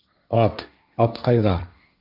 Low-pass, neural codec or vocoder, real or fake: 5.4 kHz; codec, 16 kHz, 1.1 kbps, Voila-Tokenizer; fake